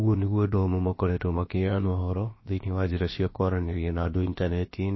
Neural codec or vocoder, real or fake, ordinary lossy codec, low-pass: codec, 16 kHz, about 1 kbps, DyCAST, with the encoder's durations; fake; MP3, 24 kbps; 7.2 kHz